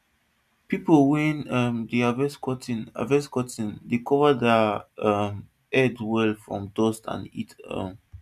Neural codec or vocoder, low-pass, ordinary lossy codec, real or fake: none; 14.4 kHz; none; real